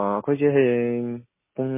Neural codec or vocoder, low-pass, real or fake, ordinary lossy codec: none; 3.6 kHz; real; MP3, 16 kbps